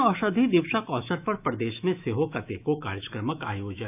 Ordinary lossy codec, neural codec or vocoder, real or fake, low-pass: none; autoencoder, 48 kHz, 128 numbers a frame, DAC-VAE, trained on Japanese speech; fake; 3.6 kHz